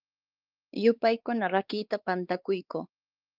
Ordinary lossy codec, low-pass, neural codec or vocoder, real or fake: Opus, 24 kbps; 5.4 kHz; codec, 16 kHz, 4 kbps, X-Codec, WavLM features, trained on Multilingual LibriSpeech; fake